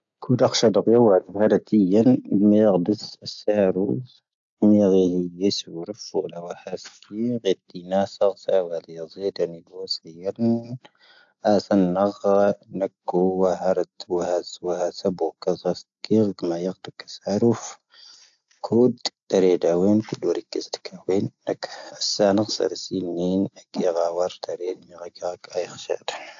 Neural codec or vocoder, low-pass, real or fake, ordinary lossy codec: none; 7.2 kHz; real; AAC, 64 kbps